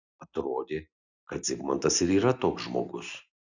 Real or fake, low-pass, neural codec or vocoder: real; 7.2 kHz; none